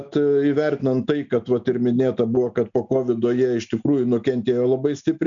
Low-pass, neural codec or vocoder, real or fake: 7.2 kHz; none; real